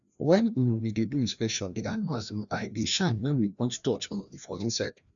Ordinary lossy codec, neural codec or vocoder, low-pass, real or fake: none; codec, 16 kHz, 1 kbps, FreqCodec, larger model; 7.2 kHz; fake